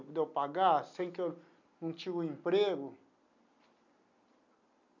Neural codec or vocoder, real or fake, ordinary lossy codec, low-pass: none; real; none; 7.2 kHz